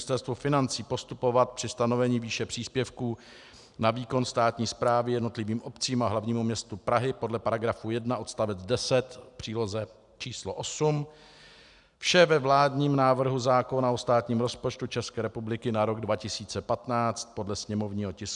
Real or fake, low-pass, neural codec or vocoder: real; 10.8 kHz; none